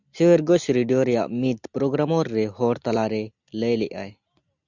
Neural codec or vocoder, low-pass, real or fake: none; 7.2 kHz; real